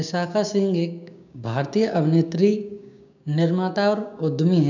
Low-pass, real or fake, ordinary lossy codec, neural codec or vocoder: 7.2 kHz; fake; none; codec, 16 kHz, 6 kbps, DAC